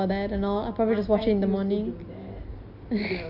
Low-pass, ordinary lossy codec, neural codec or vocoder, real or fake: 5.4 kHz; none; none; real